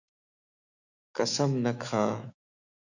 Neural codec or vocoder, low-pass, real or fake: autoencoder, 48 kHz, 128 numbers a frame, DAC-VAE, trained on Japanese speech; 7.2 kHz; fake